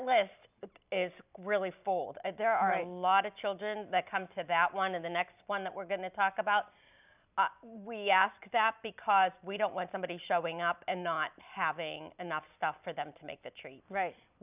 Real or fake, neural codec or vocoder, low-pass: real; none; 3.6 kHz